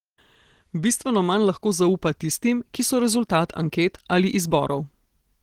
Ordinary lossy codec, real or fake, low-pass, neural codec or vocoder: Opus, 16 kbps; real; 19.8 kHz; none